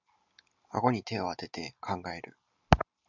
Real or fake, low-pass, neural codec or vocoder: real; 7.2 kHz; none